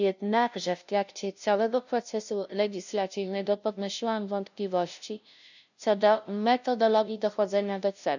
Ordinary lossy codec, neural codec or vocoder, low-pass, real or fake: none; codec, 16 kHz, 0.5 kbps, FunCodec, trained on LibriTTS, 25 frames a second; 7.2 kHz; fake